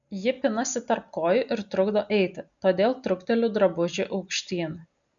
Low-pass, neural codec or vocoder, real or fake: 7.2 kHz; none; real